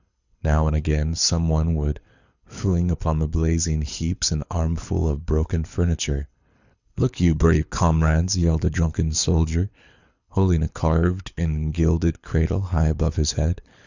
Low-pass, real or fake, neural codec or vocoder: 7.2 kHz; fake; codec, 24 kHz, 6 kbps, HILCodec